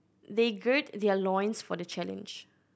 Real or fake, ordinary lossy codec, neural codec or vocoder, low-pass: real; none; none; none